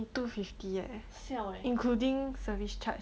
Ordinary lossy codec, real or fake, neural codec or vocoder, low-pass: none; real; none; none